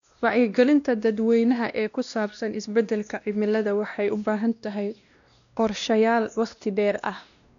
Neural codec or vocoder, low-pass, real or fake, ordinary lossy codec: codec, 16 kHz, 1 kbps, X-Codec, WavLM features, trained on Multilingual LibriSpeech; 7.2 kHz; fake; none